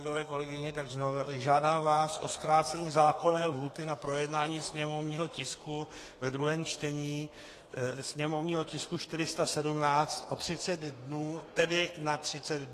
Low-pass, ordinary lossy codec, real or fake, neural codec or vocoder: 14.4 kHz; AAC, 48 kbps; fake; codec, 32 kHz, 1.9 kbps, SNAC